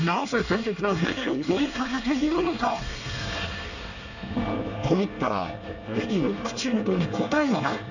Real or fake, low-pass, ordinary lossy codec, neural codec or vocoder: fake; 7.2 kHz; none; codec, 24 kHz, 1 kbps, SNAC